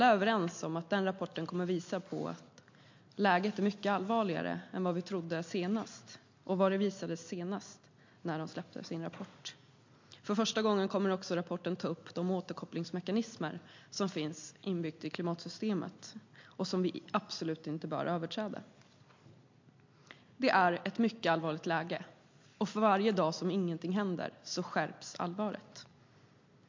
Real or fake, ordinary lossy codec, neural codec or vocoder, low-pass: real; MP3, 48 kbps; none; 7.2 kHz